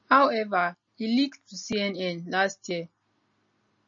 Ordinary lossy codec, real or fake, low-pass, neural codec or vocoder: MP3, 32 kbps; real; 7.2 kHz; none